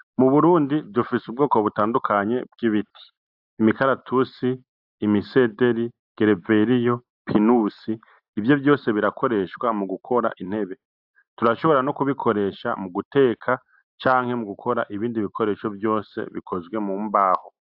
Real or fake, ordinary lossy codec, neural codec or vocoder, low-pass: real; AAC, 48 kbps; none; 5.4 kHz